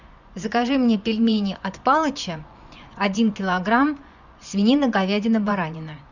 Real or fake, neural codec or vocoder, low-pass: fake; vocoder, 24 kHz, 100 mel bands, Vocos; 7.2 kHz